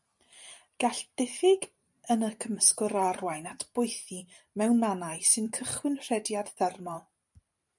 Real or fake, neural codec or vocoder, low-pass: fake; vocoder, 44.1 kHz, 128 mel bands every 512 samples, BigVGAN v2; 10.8 kHz